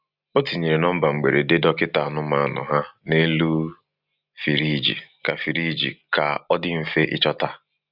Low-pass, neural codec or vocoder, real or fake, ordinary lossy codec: 5.4 kHz; none; real; Opus, 64 kbps